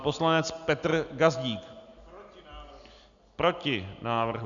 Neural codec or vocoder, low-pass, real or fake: none; 7.2 kHz; real